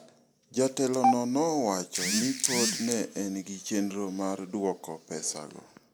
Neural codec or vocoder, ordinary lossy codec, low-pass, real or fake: none; none; none; real